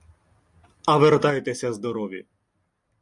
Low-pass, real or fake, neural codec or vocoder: 10.8 kHz; real; none